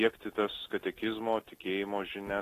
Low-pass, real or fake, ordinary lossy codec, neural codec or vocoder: 14.4 kHz; real; AAC, 48 kbps; none